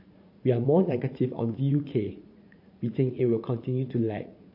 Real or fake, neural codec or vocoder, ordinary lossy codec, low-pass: fake; vocoder, 44.1 kHz, 80 mel bands, Vocos; MP3, 32 kbps; 5.4 kHz